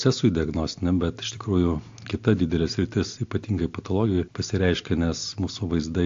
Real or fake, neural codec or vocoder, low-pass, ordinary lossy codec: real; none; 7.2 kHz; AAC, 48 kbps